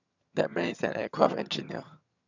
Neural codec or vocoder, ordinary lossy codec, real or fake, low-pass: vocoder, 22.05 kHz, 80 mel bands, HiFi-GAN; none; fake; 7.2 kHz